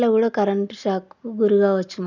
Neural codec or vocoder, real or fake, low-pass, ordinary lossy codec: none; real; 7.2 kHz; none